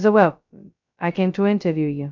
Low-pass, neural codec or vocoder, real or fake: 7.2 kHz; codec, 16 kHz, 0.2 kbps, FocalCodec; fake